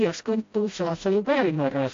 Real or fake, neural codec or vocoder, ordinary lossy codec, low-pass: fake; codec, 16 kHz, 0.5 kbps, FreqCodec, smaller model; AAC, 48 kbps; 7.2 kHz